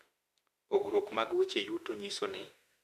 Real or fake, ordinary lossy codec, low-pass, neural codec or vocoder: fake; none; 14.4 kHz; autoencoder, 48 kHz, 32 numbers a frame, DAC-VAE, trained on Japanese speech